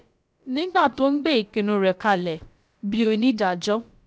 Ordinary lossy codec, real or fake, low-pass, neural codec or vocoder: none; fake; none; codec, 16 kHz, about 1 kbps, DyCAST, with the encoder's durations